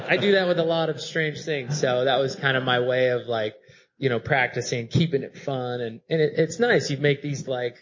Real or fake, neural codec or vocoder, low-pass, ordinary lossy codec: real; none; 7.2 kHz; MP3, 32 kbps